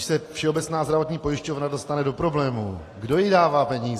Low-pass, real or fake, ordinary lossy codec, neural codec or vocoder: 14.4 kHz; real; AAC, 48 kbps; none